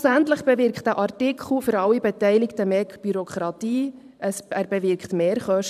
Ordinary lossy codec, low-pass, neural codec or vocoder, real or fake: none; 14.4 kHz; vocoder, 44.1 kHz, 128 mel bands every 512 samples, BigVGAN v2; fake